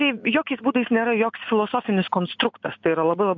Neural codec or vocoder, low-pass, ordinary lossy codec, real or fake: none; 7.2 kHz; MP3, 64 kbps; real